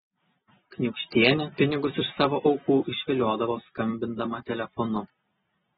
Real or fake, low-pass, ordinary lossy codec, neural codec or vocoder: real; 19.8 kHz; AAC, 16 kbps; none